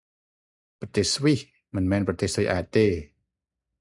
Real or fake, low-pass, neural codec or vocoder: real; 10.8 kHz; none